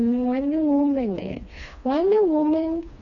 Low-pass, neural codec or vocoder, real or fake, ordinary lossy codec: 7.2 kHz; codec, 16 kHz, 2 kbps, FreqCodec, smaller model; fake; none